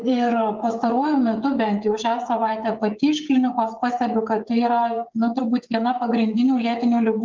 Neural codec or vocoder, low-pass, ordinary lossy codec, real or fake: codec, 16 kHz, 8 kbps, FreqCodec, smaller model; 7.2 kHz; Opus, 32 kbps; fake